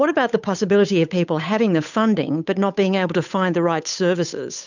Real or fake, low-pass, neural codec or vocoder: fake; 7.2 kHz; codec, 16 kHz, 8 kbps, FunCodec, trained on Chinese and English, 25 frames a second